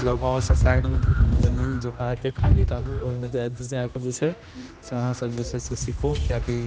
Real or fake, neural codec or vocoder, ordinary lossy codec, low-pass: fake; codec, 16 kHz, 1 kbps, X-Codec, HuBERT features, trained on general audio; none; none